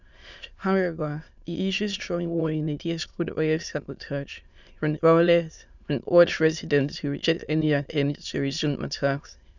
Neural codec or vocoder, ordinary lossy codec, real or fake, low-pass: autoencoder, 22.05 kHz, a latent of 192 numbers a frame, VITS, trained on many speakers; none; fake; 7.2 kHz